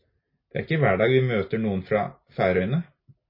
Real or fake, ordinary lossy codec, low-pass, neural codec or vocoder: real; MP3, 24 kbps; 5.4 kHz; none